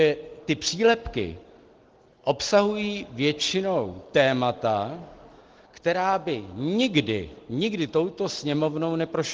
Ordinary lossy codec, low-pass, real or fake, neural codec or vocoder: Opus, 16 kbps; 7.2 kHz; real; none